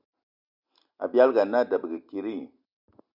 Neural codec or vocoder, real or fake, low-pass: none; real; 5.4 kHz